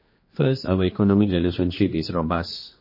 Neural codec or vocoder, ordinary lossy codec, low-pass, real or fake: codec, 16 kHz, 2 kbps, X-Codec, HuBERT features, trained on general audio; MP3, 24 kbps; 5.4 kHz; fake